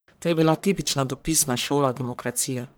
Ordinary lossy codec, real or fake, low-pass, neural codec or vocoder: none; fake; none; codec, 44.1 kHz, 1.7 kbps, Pupu-Codec